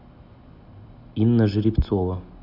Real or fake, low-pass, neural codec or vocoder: real; 5.4 kHz; none